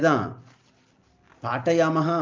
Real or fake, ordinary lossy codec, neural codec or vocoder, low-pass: real; Opus, 24 kbps; none; 7.2 kHz